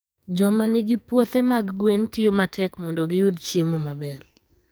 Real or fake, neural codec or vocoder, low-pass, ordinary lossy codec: fake; codec, 44.1 kHz, 2.6 kbps, SNAC; none; none